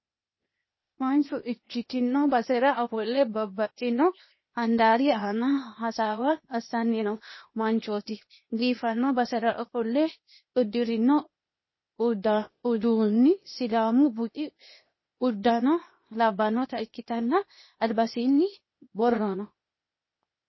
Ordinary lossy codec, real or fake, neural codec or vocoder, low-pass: MP3, 24 kbps; fake; codec, 16 kHz, 0.8 kbps, ZipCodec; 7.2 kHz